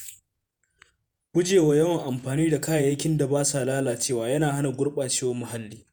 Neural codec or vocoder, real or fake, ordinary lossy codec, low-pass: vocoder, 48 kHz, 128 mel bands, Vocos; fake; none; none